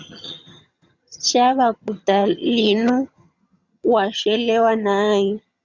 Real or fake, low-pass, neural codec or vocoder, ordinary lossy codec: fake; 7.2 kHz; vocoder, 22.05 kHz, 80 mel bands, HiFi-GAN; Opus, 64 kbps